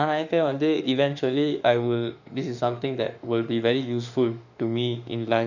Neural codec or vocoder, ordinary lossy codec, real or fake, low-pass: autoencoder, 48 kHz, 32 numbers a frame, DAC-VAE, trained on Japanese speech; none; fake; 7.2 kHz